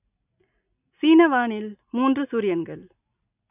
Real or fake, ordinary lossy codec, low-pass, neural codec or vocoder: real; AAC, 32 kbps; 3.6 kHz; none